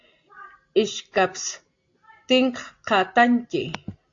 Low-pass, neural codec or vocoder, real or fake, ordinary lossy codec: 7.2 kHz; none; real; AAC, 48 kbps